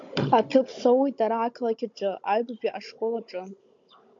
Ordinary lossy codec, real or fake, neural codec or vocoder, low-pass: MP3, 48 kbps; fake; codec, 16 kHz, 16 kbps, FunCodec, trained on Chinese and English, 50 frames a second; 7.2 kHz